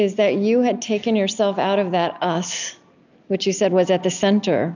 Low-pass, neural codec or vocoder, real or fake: 7.2 kHz; none; real